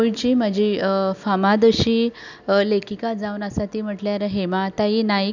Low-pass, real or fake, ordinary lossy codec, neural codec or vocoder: 7.2 kHz; real; none; none